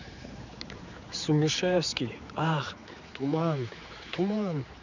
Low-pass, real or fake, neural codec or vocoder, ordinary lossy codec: 7.2 kHz; fake; codec, 16 kHz, 4 kbps, X-Codec, HuBERT features, trained on general audio; none